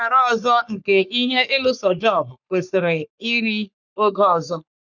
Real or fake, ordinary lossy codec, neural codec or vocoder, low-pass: fake; none; codec, 44.1 kHz, 3.4 kbps, Pupu-Codec; 7.2 kHz